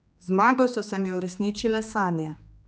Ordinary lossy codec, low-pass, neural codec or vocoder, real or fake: none; none; codec, 16 kHz, 2 kbps, X-Codec, HuBERT features, trained on general audio; fake